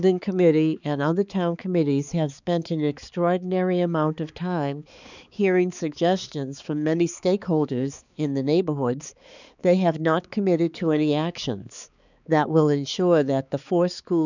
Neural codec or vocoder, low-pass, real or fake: codec, 16 kHz, 4 kbps, X-Codec, HuBERT features, trained on balanced general audio; 7.2 kHz; fake